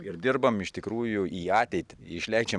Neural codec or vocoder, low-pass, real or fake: none; 10.8 kHz; real